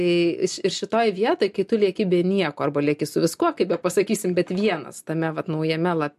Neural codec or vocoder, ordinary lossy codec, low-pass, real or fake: none; MP3, 64 kbps; 14.4 kHz; real